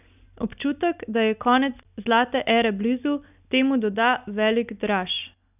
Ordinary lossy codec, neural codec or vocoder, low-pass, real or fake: none; none; 3.6 kHz; real